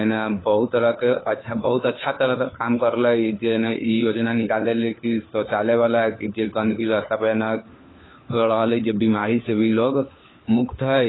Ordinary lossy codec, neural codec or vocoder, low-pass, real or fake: AAC, 16 kbps; codec, 16 kHz, 4 kbps, FunCodec, trained on LibriTTS, 50 frames a second; 7.2 kHz; fake